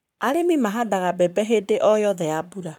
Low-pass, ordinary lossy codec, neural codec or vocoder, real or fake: 19.8 kHz; none; codec, 44.1 kHz, 7.8 kbps, Pupu-Codec; fake